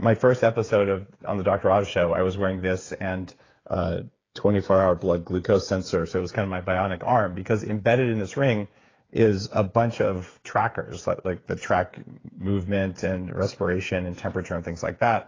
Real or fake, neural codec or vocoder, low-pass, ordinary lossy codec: fake; codec, 16 kHz, 8 kbps, FreqCodec, smaller model; 7.2 kHz; AAC, 32 kbps